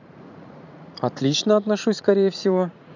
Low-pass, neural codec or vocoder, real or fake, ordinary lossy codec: 7.2 kHz; vocoder, 44.1 kHz, 80 mel bands, Vocos; fake; none